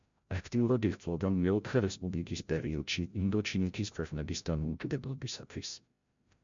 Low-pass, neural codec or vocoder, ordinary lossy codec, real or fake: 7.2 kHz; codec, 16 kHz, 0.5 kbps, FreqCodec, larger model; MP3, 64 kbps; fake